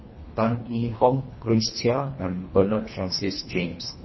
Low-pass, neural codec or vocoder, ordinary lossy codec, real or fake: 7.2 kHz; codec, 24 kHz, 1.5 kbps, HILCodec; MP3, 24 kbps; fake